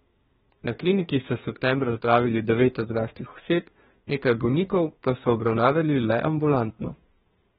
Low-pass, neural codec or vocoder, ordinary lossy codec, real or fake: 14.4 kHz; codec, 32 kHz, 1.9 kbps, SNAC; AAC, 16 kbps; fake